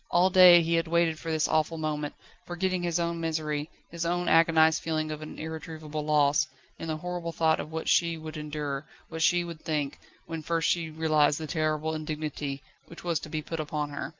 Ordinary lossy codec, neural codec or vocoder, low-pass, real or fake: Opus, 24 kbps; none; 7.2 kHz; real